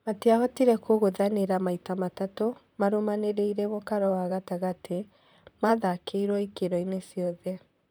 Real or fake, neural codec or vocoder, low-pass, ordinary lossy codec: fake; vocoder, 44.1 kHz, 128 mel bands, Pupu-Vocoder; none; none